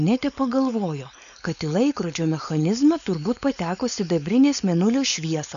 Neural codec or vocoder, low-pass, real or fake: codec, 16 kHz, 4.8 kbps, FACodec; 7.2 kHz; fake